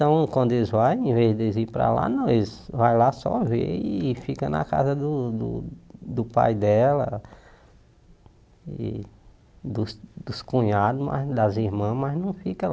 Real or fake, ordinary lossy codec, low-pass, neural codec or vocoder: real; none; none; none